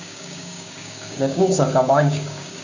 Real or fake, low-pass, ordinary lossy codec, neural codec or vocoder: fake; 7.2 kHz; none; codec, 16 kHz, 6 kbps, DAC